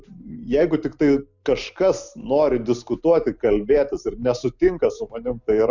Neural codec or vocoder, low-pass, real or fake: none; 7.2 kHz; real